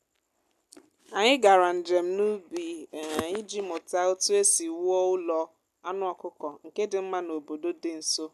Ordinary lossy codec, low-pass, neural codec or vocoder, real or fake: AAC, 96 kbps; 14.4 kHz; none; real